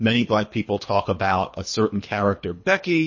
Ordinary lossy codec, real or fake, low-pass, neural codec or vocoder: MP3, 32 kbps; fake; 7.2 kHz; codec, 24 kHz, 3 kbps, HILCodec